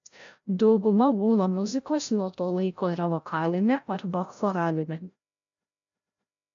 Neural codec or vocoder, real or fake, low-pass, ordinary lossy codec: codec, 16 kHz, 0.5 kbps, FreqCodec, larger model; fake; 7.2 kHz; MP3, 64 kbps